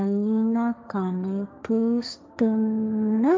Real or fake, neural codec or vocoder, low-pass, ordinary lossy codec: fake; codec, 16 kHz, 1.1 kbps, Voila-Tokenizer; none; none